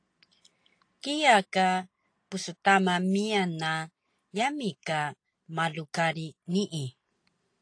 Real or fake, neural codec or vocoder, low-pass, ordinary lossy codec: real; none; 9.9 kHz; AAC, 48 kbps